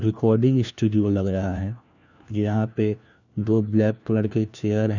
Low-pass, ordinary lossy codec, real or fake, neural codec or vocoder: 7.2 kHz; none; fake; codec, 16 kHz, 1 kbps, FunCodec, trained on LibriTTS, 50 frames a second